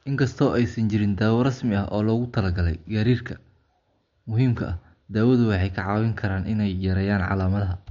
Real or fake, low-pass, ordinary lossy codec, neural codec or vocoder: real; 7.2 kHz; MP3, 48 kbps; none